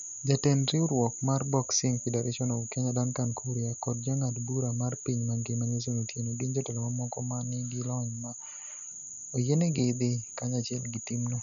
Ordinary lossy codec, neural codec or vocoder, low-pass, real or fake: none; none; 7.2 kHz; real